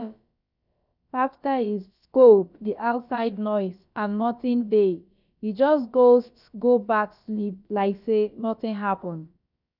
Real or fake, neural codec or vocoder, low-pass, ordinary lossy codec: fake; codec, 16 kHz, about 1 kbps, DyCAST, with the encoder's durations; 5.4 kHz; none